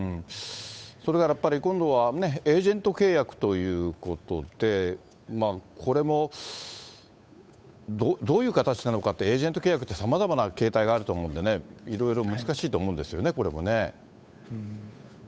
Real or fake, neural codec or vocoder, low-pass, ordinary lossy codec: fake; codec, 16 kHz, 8 kbps, FunCodec, trained on Chinese and English, 25 frames a second; none; none